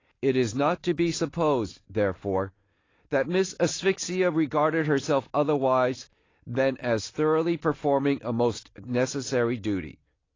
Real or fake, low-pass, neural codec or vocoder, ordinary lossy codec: real; 7.2 kHz; none; AAC, 32 kbps